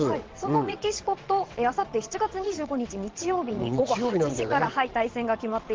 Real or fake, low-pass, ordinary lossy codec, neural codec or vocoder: fake; 7.2 kHz; Opus, 16 kbps; vocoder, 22.05 kHz, 80 mel bands, WaveNeXt